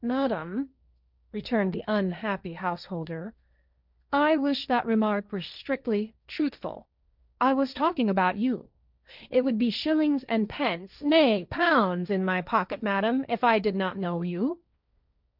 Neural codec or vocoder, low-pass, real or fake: codec, 16 kHz, 1.1 kbps, Voila-Tokenizer; 5.4 kHz; fake